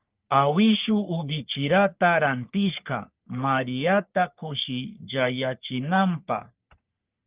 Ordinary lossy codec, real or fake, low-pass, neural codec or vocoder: Opus, 24 kbps; fake; 3.6 kHz; codec, 44.1 kHz, 3.4 kbps, Pupu-Codec